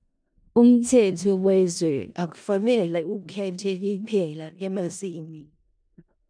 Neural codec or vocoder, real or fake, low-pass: codec, 16 kHz in and 24 kHz out, 0.4 kbps, LongCat-Audio-Codec, four codebook decoder; fake; 9.9 kHz